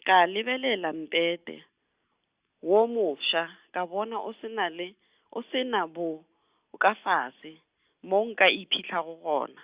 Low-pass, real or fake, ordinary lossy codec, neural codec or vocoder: 3.6 kHz; real; Opus, 64 kbps; none